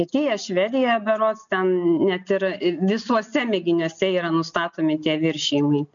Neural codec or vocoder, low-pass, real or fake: none; 7.2 kHz; real